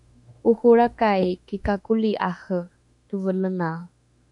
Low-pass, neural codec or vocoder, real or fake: 10.8 kHz; autoencoder, 48 kHz, 32 numbers a frame, DAC-VAE, trained on Japanese speech; fake